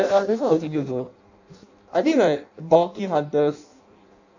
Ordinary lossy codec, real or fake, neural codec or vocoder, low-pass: none; fake; codec, 16 kHz in and 24 kHz out, 0.6 kbps, FireRedTTS-2 codec; 7.2 kHz